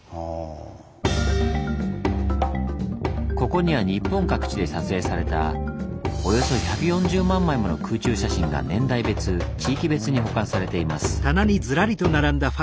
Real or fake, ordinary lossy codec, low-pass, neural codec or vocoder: real; none; none; none